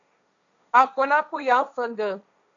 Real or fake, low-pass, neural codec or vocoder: fake; 7.2 kHz; codec, 16 kHz, 1.1 kbps, Voila-Tokenizer